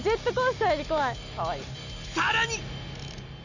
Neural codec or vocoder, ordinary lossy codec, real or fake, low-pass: none; none; real; 7.2 kHz